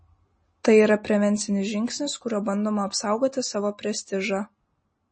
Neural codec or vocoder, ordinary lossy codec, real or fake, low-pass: none; MP3, 32 kbps; real; 9.9 kHz